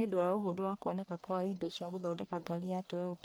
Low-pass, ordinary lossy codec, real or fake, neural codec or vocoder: none; none; fake; codec, 44.1 kHz, 1.7 kbps, Pupu-Codec